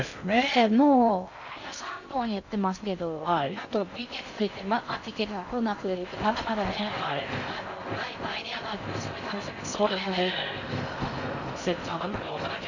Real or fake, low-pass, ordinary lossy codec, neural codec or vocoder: fake; 7.2 kHz; none; codec, 16 kHz in and 24 kHz out, 0.6 kbps, FocalCodec, streaming, 2048 codes